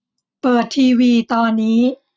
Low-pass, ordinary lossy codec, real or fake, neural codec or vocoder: none; none; real; none